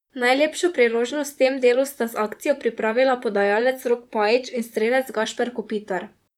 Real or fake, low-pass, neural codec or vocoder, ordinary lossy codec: fake; 19.8 kHz; vocoder, 44.1 kHz, 128 mel bands, Pupu-Vocoder; none